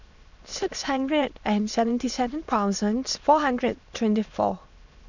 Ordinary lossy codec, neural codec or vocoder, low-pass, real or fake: none; autoencoder, 22.05 kHz, a latent of 192 numbers a frame, VITS, trained on many speakers; 7.2 kHz; fake